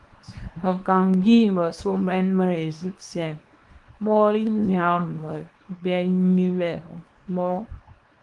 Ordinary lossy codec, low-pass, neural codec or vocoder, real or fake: Opus, 24 kbps; 10.8 kHz; codec, 24 kHz, 0.9 kbps, WavTokenizer, small release; fake